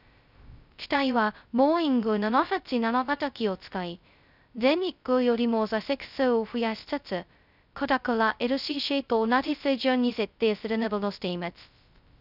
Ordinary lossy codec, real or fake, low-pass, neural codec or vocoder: none; fake; 5.4 kHz; codec, 16 kHz, 0.2 kbps, FocalCodec